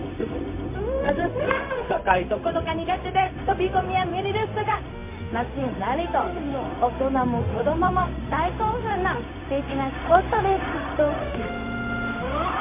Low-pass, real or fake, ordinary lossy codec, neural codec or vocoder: 3.6 kHz; fake; MP3, 24 kbps; codec, 16 kHz, 0.4 kbps, LongCat-Audio-Codec